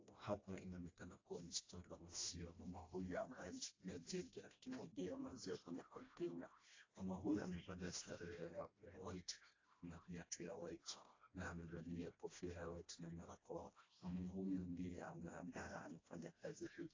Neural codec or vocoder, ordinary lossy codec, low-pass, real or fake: codec, 16 kHz, 1 kbps, FreqCodec, smaller model; AAC, 32 kbps; 7.2 kHz; fake